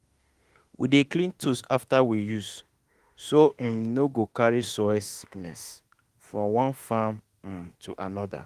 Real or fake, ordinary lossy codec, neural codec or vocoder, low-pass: fake; Opus, 24 kbps; autoencoder, 48 kHz, 32 numbers a frame, DAC-VAE, trained on Japanese speech; 14.4 kHz